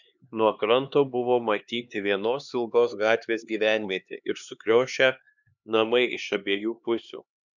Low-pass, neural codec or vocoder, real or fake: 7.2 kHz; codec, 16 kHz, 2 kbps, X-Codec, HuBERT features, trained on LibriSpeech; fake